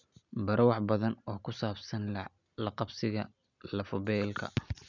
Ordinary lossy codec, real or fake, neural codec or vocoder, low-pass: none; real; none; 7.2 kHz